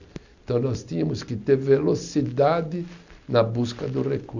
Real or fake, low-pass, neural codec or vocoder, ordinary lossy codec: real; 7.2 kHz; none; none